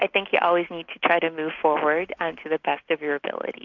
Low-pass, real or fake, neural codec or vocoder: 7.2 kHz; real; none